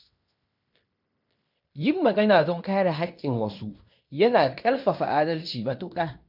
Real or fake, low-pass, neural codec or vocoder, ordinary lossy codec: fake; 5.4 kHz; codec, 16 kHz in and 24 kHz out, 0.9 kbps, LongCat-Audio-Codec, fine tuned four codebook decoder; none